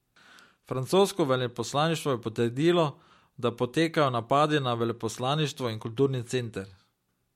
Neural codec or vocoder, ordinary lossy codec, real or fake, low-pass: none; MP3, 64 kbps; real; 19.8 kHz